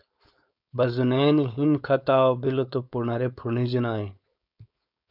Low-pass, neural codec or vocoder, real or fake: 5.4 kHz; codec, 16 kHz, 4.8 kbps, FACodec; fake